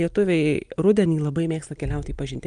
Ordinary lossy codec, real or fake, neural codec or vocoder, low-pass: Opus, 32 kbps; real; none; 9.9 kHz